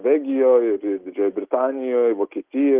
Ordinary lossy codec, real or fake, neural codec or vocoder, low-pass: Opus, 32 kbps; real; none; 3.6 kHz